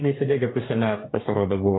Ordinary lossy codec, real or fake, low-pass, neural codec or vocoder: AAC, 16 kbps; fake; 7.2 kHz; codec, 44.1 kHz, 2.6 kbps, DAC